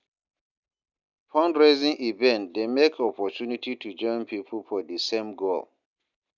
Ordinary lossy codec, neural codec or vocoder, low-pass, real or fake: none; none; 7.2 kHz; real